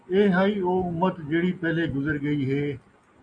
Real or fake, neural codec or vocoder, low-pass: real; none; 9.9 kHz